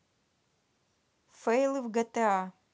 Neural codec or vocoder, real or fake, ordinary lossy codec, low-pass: none; real; none; none